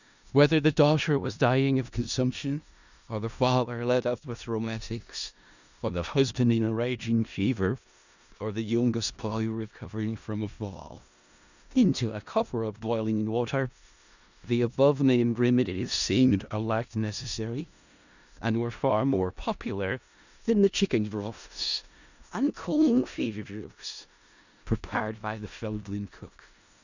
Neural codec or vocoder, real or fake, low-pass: codec, 16 kHz in and 24 kHz out, 0.4 kbps, LongCat-Audio-Codec, four codebook decoder; fake; 7.2 kHz